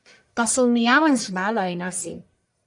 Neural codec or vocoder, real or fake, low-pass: codec, 44.1 kHz, 1.7 kbps, Pupu-Codec; fake; 10.8 kHz